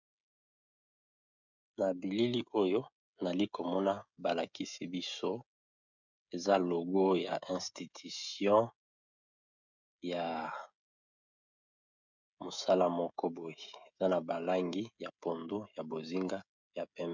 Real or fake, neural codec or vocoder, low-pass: fake; codec, 16 kHz, 16 kbps, FreqCodec, smaller model; 7.2 kHz